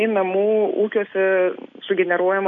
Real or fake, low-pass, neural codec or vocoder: real; 7.2 kHz; none